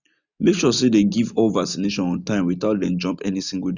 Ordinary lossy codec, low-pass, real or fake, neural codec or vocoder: none; 7.2 kHz; real; none